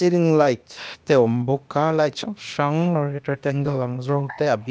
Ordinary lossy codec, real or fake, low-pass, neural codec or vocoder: none; fake; none; codec, 16 kHz, 0.8 kbps, ZipCodec